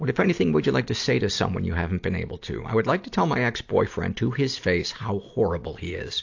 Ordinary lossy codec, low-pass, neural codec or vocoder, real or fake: AAC, 48 kbps; 7.2 kHz; none; real